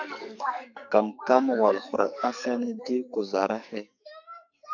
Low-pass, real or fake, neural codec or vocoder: 7.2 kHz; fake; codec, 44.1 kHz, 2.6 kbps, SNAC